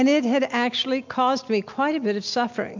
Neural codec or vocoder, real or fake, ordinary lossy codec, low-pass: none; real; MP3, 64 kbps; 7.2 kHz